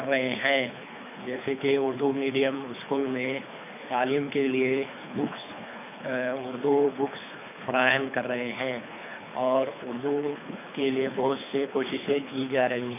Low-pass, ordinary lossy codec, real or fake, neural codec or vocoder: 3.6 kHz; none; fake; codec, 24 kHz, 3 kbps, HILCodec